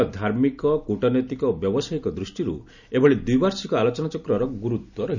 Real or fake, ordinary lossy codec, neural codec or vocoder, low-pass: real; none; none; 7.2 kHz